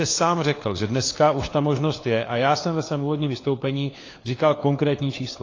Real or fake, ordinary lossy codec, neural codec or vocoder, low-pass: fake; AAC, 32 kbps; codec, 16 kHz, 4 kbps, FunCodec, trained on LibriTTS, 50 frames a second; 7.2 kHz